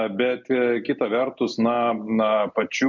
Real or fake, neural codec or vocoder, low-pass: real; none; 7.2 kHz